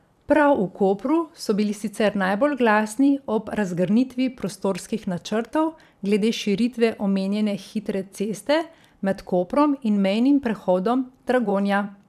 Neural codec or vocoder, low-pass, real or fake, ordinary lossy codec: vocoder, 44.1 kHz, 128 mel bands every 512 samples, BigVGAN v2; 14.4 kHz; fake; none